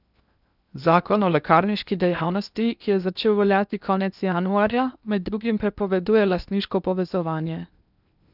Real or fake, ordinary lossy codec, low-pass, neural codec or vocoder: fake; none; 5.4 kHz; codec, 16 kHz in and 24 kHz out, 0.8 kbps, FocalCodec, streaming, 65536 codes